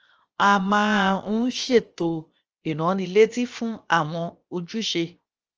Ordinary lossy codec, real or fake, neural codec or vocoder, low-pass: Opus, 24 kbps; fake; codec, 16 kHz, 0.7 kbps, FocalCodec; 7.2 kHz